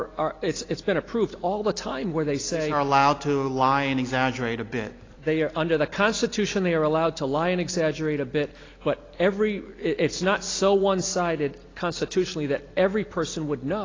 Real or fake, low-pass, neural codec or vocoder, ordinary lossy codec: real; 7.2 kHz; none; AAC, 32 kbps